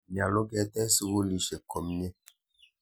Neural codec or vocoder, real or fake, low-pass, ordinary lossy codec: none; real; none; none